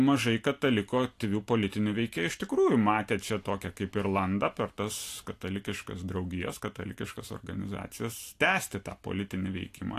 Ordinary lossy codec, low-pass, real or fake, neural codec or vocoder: AAC, 64 kbps; 14.4 kHz; real; none